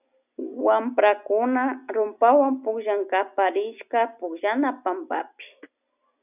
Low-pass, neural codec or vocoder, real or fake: 3.6 kHz; none; real